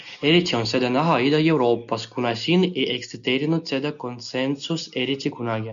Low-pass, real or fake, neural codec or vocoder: 7.2 kHz; real; none